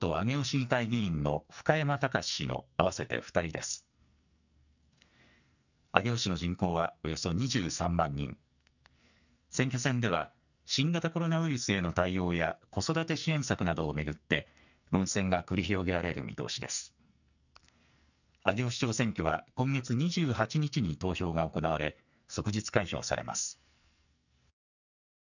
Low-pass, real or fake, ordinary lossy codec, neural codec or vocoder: 7.2 kHz; fake; none; codec, 44.1 kHz, 2.6 kbps, SNAC